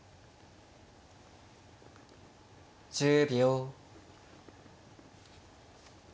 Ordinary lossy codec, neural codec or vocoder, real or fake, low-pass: none; none; real; none